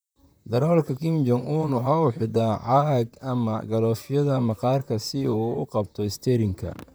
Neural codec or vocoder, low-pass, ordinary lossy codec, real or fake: vocoder, 44.1 kHz, 128 mel bands, Pupu-Vocoder; none; none; fake